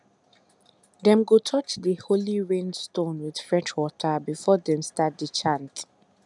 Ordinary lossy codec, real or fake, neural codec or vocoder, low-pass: none; real; none; 10.8 kHz